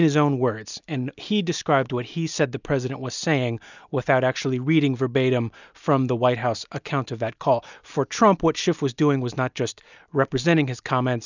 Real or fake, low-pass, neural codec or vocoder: real; 7.2 kHz; none